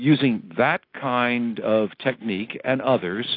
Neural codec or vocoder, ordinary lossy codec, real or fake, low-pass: none; AAC, 32 kbps; real; 5.4 kHz